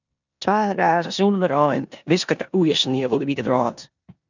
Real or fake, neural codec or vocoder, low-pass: fake; codec, 16 kHz in and 24 kHz out, 0.9 kbps, LongCat-Audio-Codec, four codebook decoder; 7.2 kHz